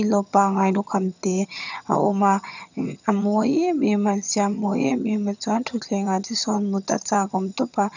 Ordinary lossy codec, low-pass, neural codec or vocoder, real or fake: none; 7.2 kHz; vocoder, 22.05 kHz, 80 mel bands, HiFi-GAN; fake